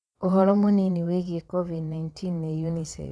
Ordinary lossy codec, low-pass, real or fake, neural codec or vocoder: none; none; fake; vocoder, 22.05 kHz, 80 mel bands, WaveNeXt